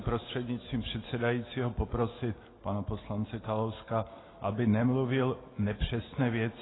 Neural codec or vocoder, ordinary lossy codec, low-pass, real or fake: none; AAC, 16 kbps; 7.2 kHz; real